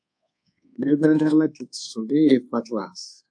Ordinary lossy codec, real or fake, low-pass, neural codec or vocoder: AAC, 64 kbps; fake; 9.9 kHz; codec, 24 kHz, 1.2 kbps, DualCodec